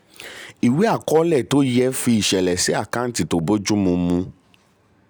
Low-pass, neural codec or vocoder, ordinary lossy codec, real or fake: none; none; none; real